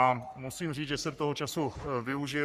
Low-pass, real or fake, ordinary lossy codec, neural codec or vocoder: 14.4 kHz; fake; Opus, 64 kbps; codec, 44.1 kHz, 3.4 kbps, Pupu-Codec